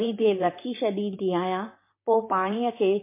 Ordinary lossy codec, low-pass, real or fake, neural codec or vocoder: MP3, 24 kbps; 3.6 kHz; fake; vocoder, 44.1 kHz, 128 mel bands, Pupu-Vocoder